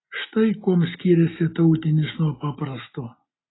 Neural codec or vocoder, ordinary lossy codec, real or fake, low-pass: none; AAC, 16 kbps; real; 7.2 kHz